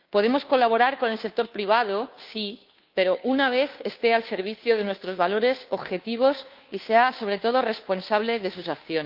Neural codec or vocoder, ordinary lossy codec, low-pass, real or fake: codec, 16 kHz, 2 kbps, FunCodec, trained on Chinese and English, 25 frames a second; Opus, 24 kbps; 5.4 kHz; fake